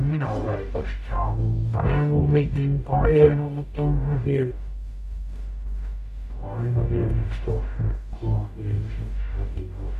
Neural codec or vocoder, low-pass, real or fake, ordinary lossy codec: codec, 44.1 kHz, 0.9 kbps, DAC; 14.4 kHz; fake; none